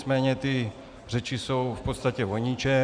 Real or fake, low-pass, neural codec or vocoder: real; 9.9 kHz; none